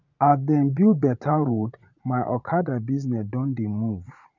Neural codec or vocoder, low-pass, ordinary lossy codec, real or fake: vocoder, 44.1 kHz, 80 mel bands, Vocos; 7.2 kHz; none; fake